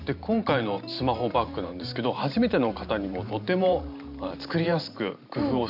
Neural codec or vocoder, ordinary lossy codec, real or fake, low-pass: vocoder, 44.1 kHz, 128 mel bands every 512 samples, BigVGAN v2; none; fake; 5.4 kHz